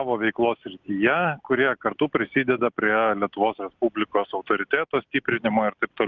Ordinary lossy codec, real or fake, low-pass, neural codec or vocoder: Opus, 24 kbps; real; 7.2 kHz; none